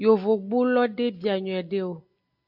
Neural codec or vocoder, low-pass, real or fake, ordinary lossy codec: none; 5.4 kHz; real; AAC, 48 kbps